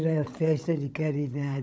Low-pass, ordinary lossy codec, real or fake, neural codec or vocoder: none; none; fake; codec, 16 kHz, 16 kbps, FunCodec, trained on LibriTTS, 50 frames a second